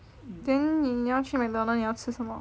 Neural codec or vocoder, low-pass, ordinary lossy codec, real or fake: none; none; none; real